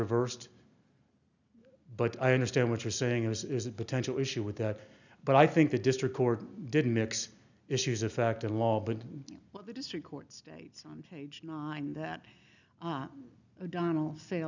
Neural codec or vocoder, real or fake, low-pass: none; real; 7.2 kHz